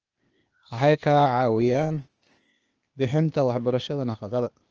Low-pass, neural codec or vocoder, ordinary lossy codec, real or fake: 7.2 kHz; codec, 16 kHz, 0.8 kbps, ZipCodec; Opus, 24 kbps; fake